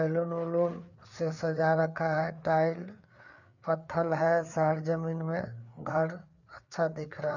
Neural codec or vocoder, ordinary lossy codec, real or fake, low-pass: codec, 16 kHz, 4 kbps, FreqCodec, larger model; none; fake; 7.2 kHz